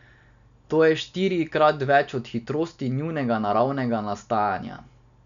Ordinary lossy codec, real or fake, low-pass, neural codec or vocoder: none; real; 7.2 kHz; none